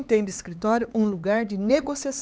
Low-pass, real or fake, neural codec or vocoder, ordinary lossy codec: none; fake; codec, 16 kHz, 4 kbps, X-Codec, HuBERT features, trained on LibriSpeech; none